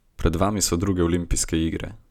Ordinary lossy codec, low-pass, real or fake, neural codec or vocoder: none; 19.8 kHz; real; none